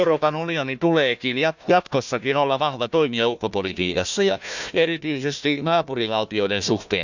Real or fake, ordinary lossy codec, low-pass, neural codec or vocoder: fake; none; 7.2 kHz; codec, 16 kHz, 1 kbps, FunCodec, trained on Chinese and English, 50 frames a second